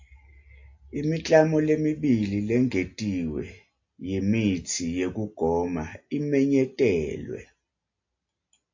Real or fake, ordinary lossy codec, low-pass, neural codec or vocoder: real; AAC, 48 kbps; 7.2 kHz; none